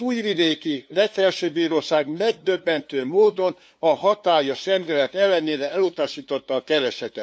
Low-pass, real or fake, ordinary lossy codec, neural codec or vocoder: none; fake; none; codec, 16 kHz, 2 kbps, FunCodec, trained on LibriTTS, 25 frames a second